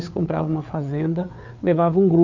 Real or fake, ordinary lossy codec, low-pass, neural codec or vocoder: fake; none; 7.2 kHz; codec, 16 kHz, 4 kbps, FreqCodec, larger model